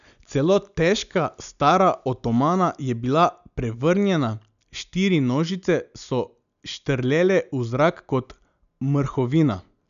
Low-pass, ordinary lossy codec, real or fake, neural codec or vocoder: 7.2 kHz; none; real; none